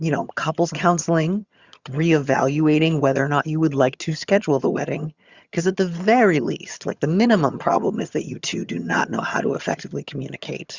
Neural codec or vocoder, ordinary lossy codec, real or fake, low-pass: vocoder, 22.05 kHz, 80 mel bands, HiFi-GAN; Opus, 64 kbps; fake; 7.2 kHz